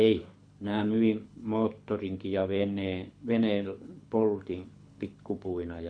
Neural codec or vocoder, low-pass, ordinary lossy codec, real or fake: codec, 24 kHz, 6 kbps, HILCodec; 9.9 kHz; none; fake